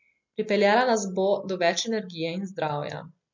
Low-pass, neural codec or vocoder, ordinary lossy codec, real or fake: 7.2 kHz; none; MP3, 48 kbps; real